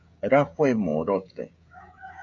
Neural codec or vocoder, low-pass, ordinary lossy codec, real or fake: codec, 16 kHz, 16 kbps, FreqCodec, smaller model; 7.2 kHz; MP3, 64 kbps; fake